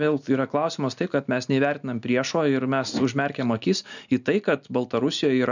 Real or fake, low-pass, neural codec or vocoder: real; 7.2 kHz; none